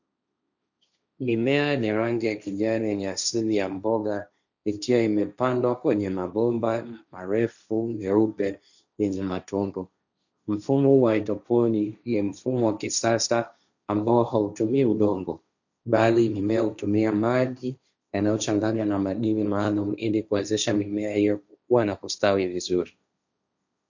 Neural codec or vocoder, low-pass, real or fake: codec, 16 kHz, 1.1 kbps, Voila-Tokenizer; 7.2 kHz; fake